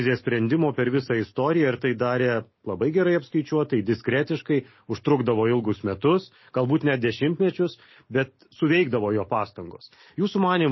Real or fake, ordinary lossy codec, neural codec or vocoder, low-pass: real; MP3, 24 kbps; none; 7.2 kHz